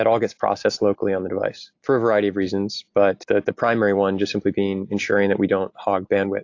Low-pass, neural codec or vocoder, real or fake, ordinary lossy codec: 7.2 kHz; none; real; AAC, 48 kbps